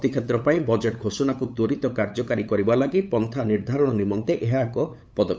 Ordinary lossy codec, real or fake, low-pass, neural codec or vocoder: none; fake; none; codec, 16 kHz, 8 kbps, FunCodec, trained on LibriTTS, 25 frames a second